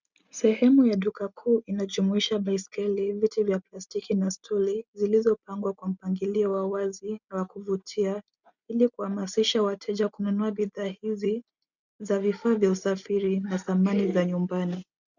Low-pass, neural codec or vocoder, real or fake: 7.2 kHz; none; real